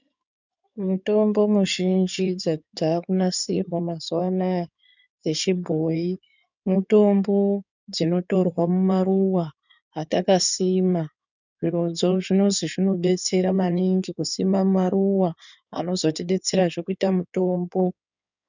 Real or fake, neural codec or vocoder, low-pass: fake; codec, 16 kHz in and 24 kHz out, 2.2 kbps, FireRedTTS-2 codec; 7.2 kHz